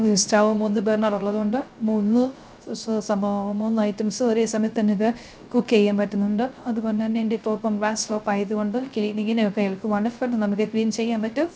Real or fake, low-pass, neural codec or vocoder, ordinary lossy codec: fake; none; codec, 16 kHz, 0.3 kbps, FocalCodec; none